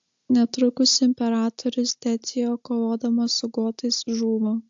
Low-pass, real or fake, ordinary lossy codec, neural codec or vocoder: 7.2 kHz; real; AAC, 64 kbps; none